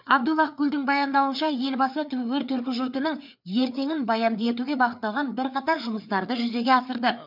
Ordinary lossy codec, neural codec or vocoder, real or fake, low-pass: none; codec, 16 kHz, 4 kbps, FreqCodec, larger model; fake; 5.4 kHz